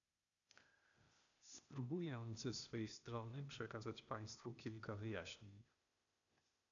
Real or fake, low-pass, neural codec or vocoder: fake; 7.2 kHz; codec, 16 kHz, 0.8 kbps, ZipCodec